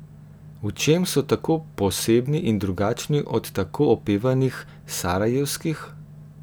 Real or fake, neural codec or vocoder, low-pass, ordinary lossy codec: real; none; none; none